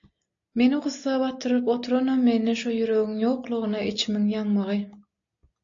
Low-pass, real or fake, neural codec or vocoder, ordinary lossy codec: 7.2 kHz; real; none; AAC, 48 kbps